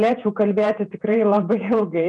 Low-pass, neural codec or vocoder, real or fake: 10.8 kHz; vocoder, 48 kHz, 128 mel bands, Vocos; fake